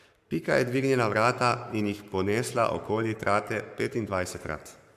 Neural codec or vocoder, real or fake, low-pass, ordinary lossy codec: codec, 44.1 kHz, 7.8 kbps, Pupu-Codec; fake; 14.4 kHz; MP3, 96 kbps